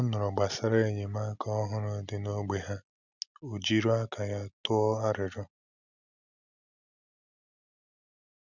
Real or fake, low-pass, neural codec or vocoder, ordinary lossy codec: real; 7.2 kHz; none; none